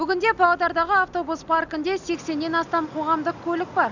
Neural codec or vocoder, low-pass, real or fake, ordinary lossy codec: none; 7.2 kHz; real; none